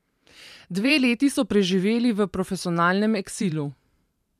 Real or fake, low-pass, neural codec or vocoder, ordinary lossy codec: fake; 14.4 kHz; vocoder, 44.1 kHz, 128 mel bands every 512 samples, BigVGAN v2; none